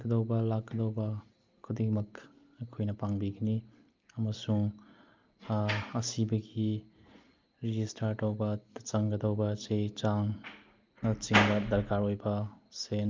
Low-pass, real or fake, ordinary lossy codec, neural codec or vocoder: 7.2 kHz; real; Opus, 24 kbps; none